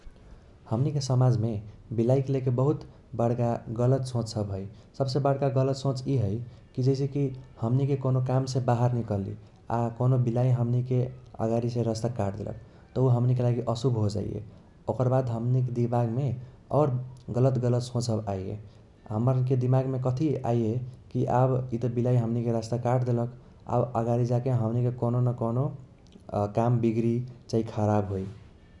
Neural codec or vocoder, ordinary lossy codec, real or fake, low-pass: none; none; real; 10.8 kHz